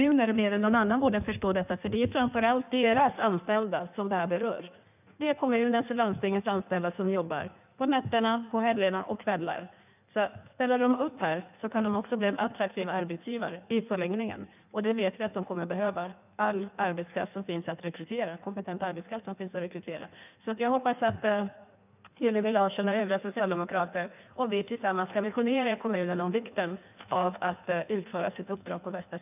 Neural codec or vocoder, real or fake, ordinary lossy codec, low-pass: codec, 16 kHz in and 24 kHz out, 1.1 kbps, FireRedTTS-2 codec; fake; none; 3.6 kHz